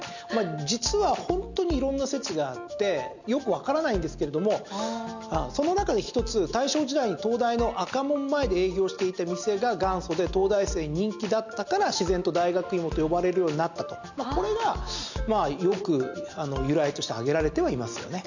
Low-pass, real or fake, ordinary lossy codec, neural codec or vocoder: 7.2 kHz; real; none; none